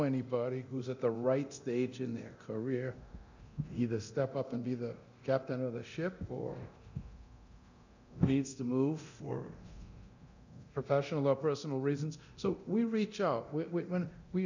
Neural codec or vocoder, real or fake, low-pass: codec, 24 kHz, 0.9 kbps, DualCodec; fake; 7.2 kHz